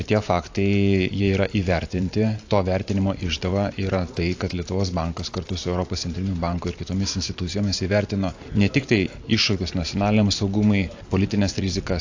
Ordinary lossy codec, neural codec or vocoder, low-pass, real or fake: AAC, 48 kbps; vocoder, 44.1 kHz, 128 mel bands every 512 samples, BigVGAN v2; 7.2 kHz; fake